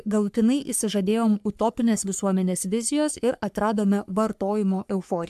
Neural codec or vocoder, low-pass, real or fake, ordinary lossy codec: codec, 44.1 kHz, 3.4 kbps, Pupu-Codec; 14.4 kHz; fake; AAC, 96 kbps